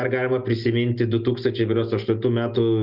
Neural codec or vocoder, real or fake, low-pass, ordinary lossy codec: none; real; 5.4 kHz; Opus, 32 kbps